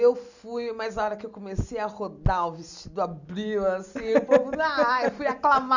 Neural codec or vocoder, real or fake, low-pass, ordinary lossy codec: none; real; 7.2 kHz; none